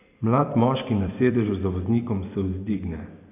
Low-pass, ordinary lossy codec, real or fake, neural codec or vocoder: 3.6 kHz; none; fake; vocoder, 44.1 kHz, 128 mel bands every 512 samples, BigVGAN v2